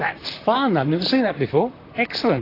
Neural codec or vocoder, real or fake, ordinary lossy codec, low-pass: vocoder, 44.1 kHz, 128 mel bands, Pupu-Vocoder; fake; AAC, 24 kbps; 5.4 kHz